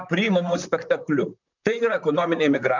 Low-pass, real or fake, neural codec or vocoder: 7.2 kHz; fake; vocoder, 44.1 kHz, 128 mel bands, Pupu-Vocoder